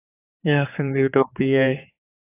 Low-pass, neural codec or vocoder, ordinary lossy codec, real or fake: 3.6 kHz; codec, 16 kHz, 4 kbps, X-Codec, HuBERT features, trained on general audio; AAC, 24 kbps; fake